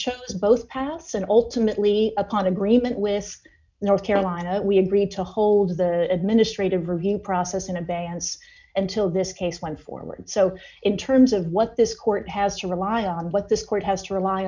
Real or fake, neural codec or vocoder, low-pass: real; none; 7.2 kHz